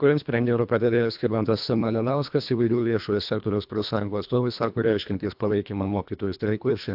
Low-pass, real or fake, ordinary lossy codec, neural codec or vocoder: 5.4 kHz; fake; AAC, 48 kbps; codec, 24 kHz, 1.5 kbps, HILCodec